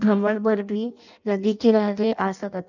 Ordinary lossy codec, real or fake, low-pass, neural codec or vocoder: none; fake; 7.2 kHz; codec, 16 kHz in and 24 kHz out, 0.6 kbps, FireRedTTS-2 codec